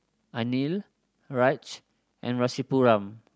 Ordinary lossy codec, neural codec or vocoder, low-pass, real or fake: none; none; none; real